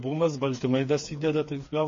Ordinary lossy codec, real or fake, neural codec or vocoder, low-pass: MP3, 32 kbps; fake; codec, 16 kHz, 4 kbps, FreqCodec, smaller model; 7.2 kHz